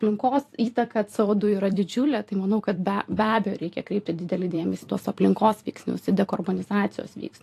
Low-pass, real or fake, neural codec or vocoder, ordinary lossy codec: 14.4 kHz; fake; vocoder, 44.1 kHz, 128 mel bands every 256 samples, BigVGAN v2; AAC, 64 kbps